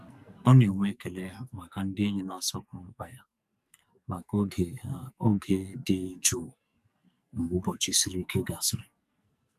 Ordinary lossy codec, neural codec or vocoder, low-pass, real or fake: none; codec, 44.1 kHz, 2.6 kbps, SNAC; 14.4 kHz; fake